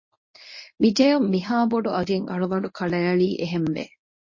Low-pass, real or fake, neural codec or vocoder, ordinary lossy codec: 7.2 kHz; fake; codec, 24 kHz, 0.9 kbps, WavTokenizer, medium speech release version 1; MP3, 32 kbps